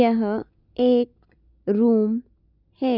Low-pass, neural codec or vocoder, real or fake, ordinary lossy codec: 5.4 kHz; none; real; none